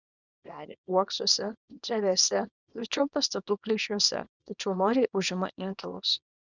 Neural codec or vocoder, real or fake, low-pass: codec, 24 kHz, 0.9 kbps, WavTokenizer, small release; fake; 7.2 kHz